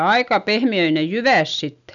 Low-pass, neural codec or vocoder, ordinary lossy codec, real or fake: 7.2 kHz; none; none; real